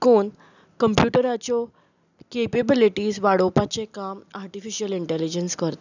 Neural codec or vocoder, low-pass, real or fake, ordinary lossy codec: none; 7.2 kHz; real; none